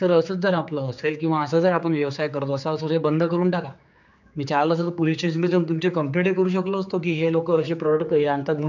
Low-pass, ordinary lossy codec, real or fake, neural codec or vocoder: 7.2 kHz; none; fake; codec, 16 kHz, 4 kbps, X-Codec, HuBERT features, trained on general audio